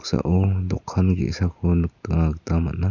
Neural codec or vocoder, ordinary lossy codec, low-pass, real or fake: none; none; 7.2 kHz; real